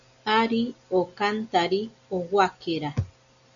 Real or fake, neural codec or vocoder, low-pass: real; none; 7.2 kHz